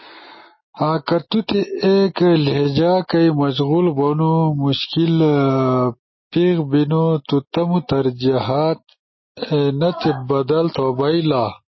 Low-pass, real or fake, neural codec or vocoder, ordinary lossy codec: 7.2 kHz; real; none; MP3, 24 kbps